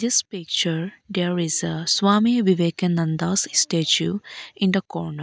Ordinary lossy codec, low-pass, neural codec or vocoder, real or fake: none; none; none; real